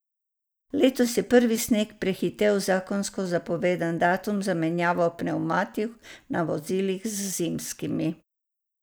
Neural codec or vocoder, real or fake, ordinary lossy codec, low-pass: none; real; none; none